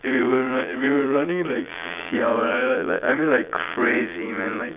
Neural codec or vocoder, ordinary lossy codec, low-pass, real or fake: vocoder, 22.05 kHz, 80 mel bands, Vocos; none; 3.6 kHz; fake